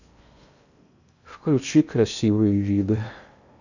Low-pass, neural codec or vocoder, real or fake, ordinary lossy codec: 7.2 kHz; codec, 16 kHz in and 24 kHz out, 0.6 kbps, FocalCodec, streaming, 4096 codes; fake; none